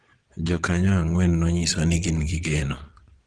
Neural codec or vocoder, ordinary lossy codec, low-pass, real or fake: vocoder, 22.05 kHz, 80 mel bands, Vocos; Opus, 16 kbps; 9.9 kHz; fake